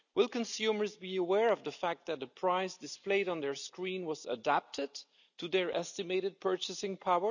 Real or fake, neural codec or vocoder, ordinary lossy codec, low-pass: real; none; none; 7.2 kHz